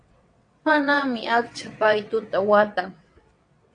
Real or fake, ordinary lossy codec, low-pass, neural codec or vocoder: fake; AAC, 48 kbps; 9.9 kHz; vocoder, 22.05 kHz, 80 mel bands, WaveNeXt